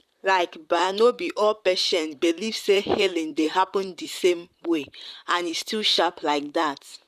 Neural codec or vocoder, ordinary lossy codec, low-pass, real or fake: vocoder, 44.1 kHz, 128 mel bands, Pupu-Vocoder; none; 14.4 kHz; fake